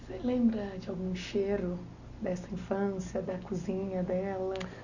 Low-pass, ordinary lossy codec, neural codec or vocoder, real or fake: 7.2 kHz; none; none; real